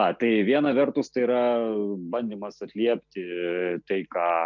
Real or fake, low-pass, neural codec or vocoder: real; 7.2 kHz; none